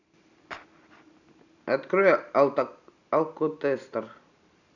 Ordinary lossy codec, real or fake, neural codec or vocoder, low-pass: none; real; none; 7.2 kHz